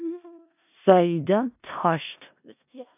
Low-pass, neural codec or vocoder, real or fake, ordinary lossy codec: 3.6 kHz; codec, 16 kHz in and 24 kHz out, 0.4 kbps, LongCat-Audio-Codec, four codebook decoder; fake; AAC, 32 kbps